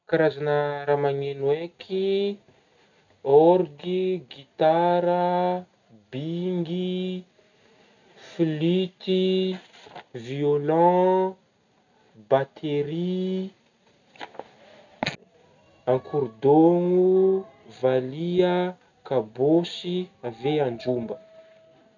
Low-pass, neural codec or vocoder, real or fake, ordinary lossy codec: 7.2 kHz; none; real; none